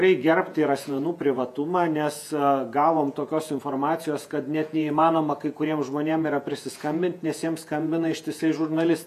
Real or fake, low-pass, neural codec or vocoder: fake; 14.4 kHz; vocoder, 48 kHz, 128 mel bands, Vocos